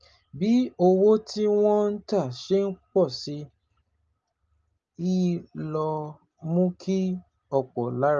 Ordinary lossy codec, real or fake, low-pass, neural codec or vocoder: Opus, 24 kbps; real; 7.2 kHz; none